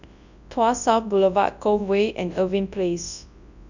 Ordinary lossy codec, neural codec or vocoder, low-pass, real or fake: none; codec, 24 kHz, 0.9 kbps, WavTokenizer, large speech release; 7.2 kHz; fake